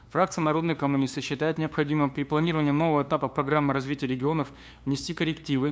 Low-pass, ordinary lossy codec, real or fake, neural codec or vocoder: none; none; fake; codec, 16 kHz, 2 kbps, FunCodec, trained on LibriTTS, 25 frames a second